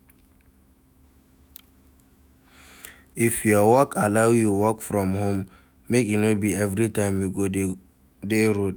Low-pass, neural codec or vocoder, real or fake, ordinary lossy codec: none; autoencoder, 48 kHz, 128 numbers a frame, DAC-VAE, trained on Japanese speech; fake; none